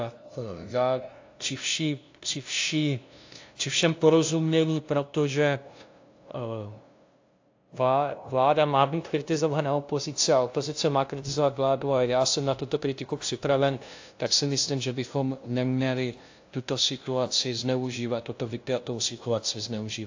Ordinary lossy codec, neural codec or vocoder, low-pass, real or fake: AAC, 48 kbps; codec, 16 kHz, 0.5 kbps, FunCodec, trained on LibriTTS, 25 frames a second; 7.2 kHz; fake